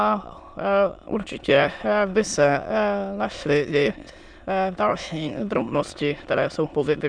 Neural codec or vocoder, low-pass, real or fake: autoencoder, 22.05 kHz, a latent of 192 numbers a frame, VITS, trained on many speakers; 9.9 kHz; fake